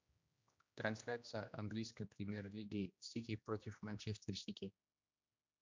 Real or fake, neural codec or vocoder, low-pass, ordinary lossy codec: fake; codec, 16 kHz, 1 kbps, X-Codec, HuBERT features, trained on general audio; 7.2 kHz; MP3, 64 kbps